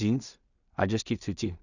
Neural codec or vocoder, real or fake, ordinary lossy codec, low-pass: codec, 16 kHz in and 24 kHz out, 0.4 kbps, LongCat-Audio-Codec, two codebook decoder; fake; none; 7.2 kHz